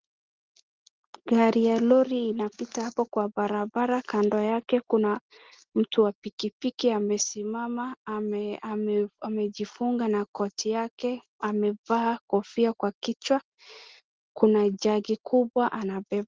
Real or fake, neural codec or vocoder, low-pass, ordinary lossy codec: real; none; 7.2 kHz; Opus, 16 kbps